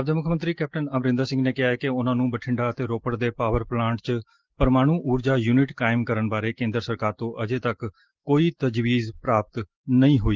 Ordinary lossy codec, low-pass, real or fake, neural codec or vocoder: Opus, 16 kbps; 7.2 kHz; real; none